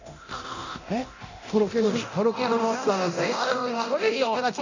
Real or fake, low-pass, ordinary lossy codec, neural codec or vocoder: fake; 7.2 kHz; none; codec, 24 kHz, 0.9 kbps, DualCodec